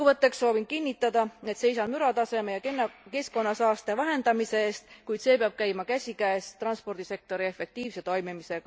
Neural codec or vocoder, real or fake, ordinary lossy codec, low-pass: none; real; none; none